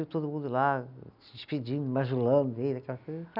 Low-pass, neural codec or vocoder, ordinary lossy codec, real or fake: 5.4 kHz; none; none; real